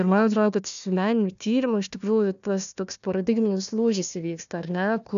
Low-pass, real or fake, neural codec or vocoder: 7.2 kHz; fake; codec, 16 kHz, 1 kbps, FunCodec, trained on Chinese and English, 50 frames a second